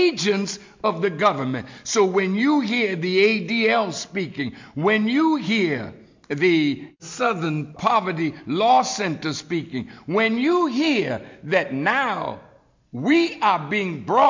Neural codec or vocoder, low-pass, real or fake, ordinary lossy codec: none; 7.2 kHz; real; MP3, 48 kbps